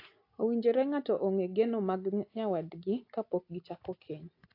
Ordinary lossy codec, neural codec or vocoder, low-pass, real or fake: AAC, 32 kbps; none; 5.4 kHz; real